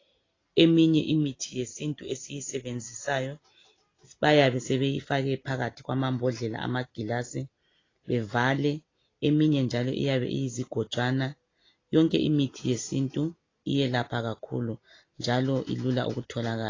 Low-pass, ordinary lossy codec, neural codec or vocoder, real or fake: 7.2 kHz; AAC, 32 kbps; none; real